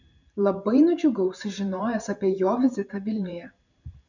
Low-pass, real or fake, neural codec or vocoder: 7.2 kHz; fake; vocoder, 44.1 kHz, 128 mel bands every 512 samples, BigVGAN v2